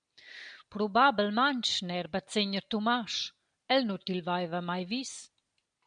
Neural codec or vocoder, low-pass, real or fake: none; 9.9 kHz; real